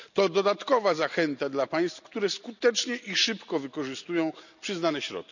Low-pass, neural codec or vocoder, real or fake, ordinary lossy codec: 7.2 kHz; none; real; none